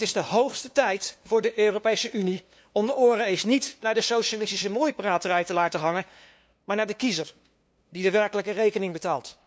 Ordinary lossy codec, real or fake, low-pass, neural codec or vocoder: none; fake; none; codec, 16 kHz, 2 kbps, FunCodec, trained on LibriTTS, 25 frames a second